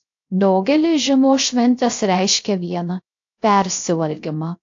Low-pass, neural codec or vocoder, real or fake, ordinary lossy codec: 7.2 kHz; codec, 16 kHz, 0.3 kbps, FocalCodec; fake; AAC, 48 kbps